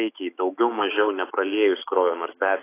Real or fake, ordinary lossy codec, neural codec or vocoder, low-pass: fake; AAC, 16 kbps; autoencoder, 48 kHz, 128 numbers a frame, DAC-VAE, trained on Japanese speech; 3.6 kHz